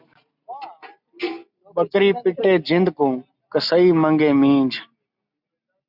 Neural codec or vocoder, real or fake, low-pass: none; real; 5.4 kHz